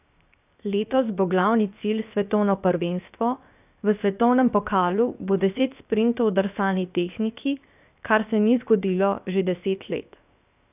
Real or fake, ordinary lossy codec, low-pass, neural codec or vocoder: fake; none; 3.6 kHz; codec, 16 kHz, 0.7 kbps, FocalCodec